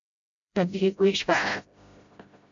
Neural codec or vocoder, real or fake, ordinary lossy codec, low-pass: codec, 16 kHz, 0.5 kbps, FreqCodec, smaller model; fake; MP3, 64 kbps; 7.2 kHz